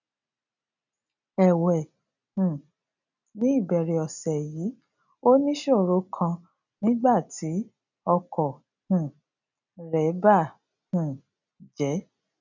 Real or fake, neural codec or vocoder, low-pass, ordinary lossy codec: real; none; 7.2 kHz; none